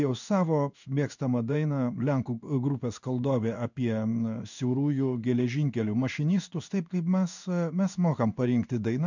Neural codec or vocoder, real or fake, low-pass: codec, 16 kHz in and 24 kHz out, 1 kbps, XY-Tokenizer; fake; 7.2 kHz